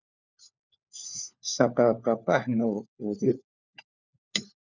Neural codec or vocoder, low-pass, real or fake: codec, 16 kHz, 4 kbps, FunCodec, trained on LibriTTS, 50 frames a second; 7.2 kHz; fake